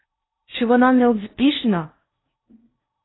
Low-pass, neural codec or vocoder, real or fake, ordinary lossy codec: 7.2 kHz; codec, 16 kHz in and 24 kHz out, 0.8 kbps, FocalCodec, streaming, 65536 codes; fake; AAC, 16 kbps